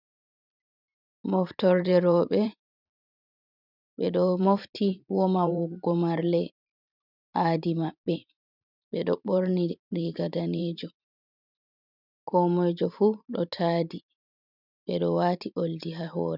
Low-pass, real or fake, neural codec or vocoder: 5.4 kHz; fake; vocoder, 44.1 kHz, 128 mel bands every 512 samples, BigVGAN v2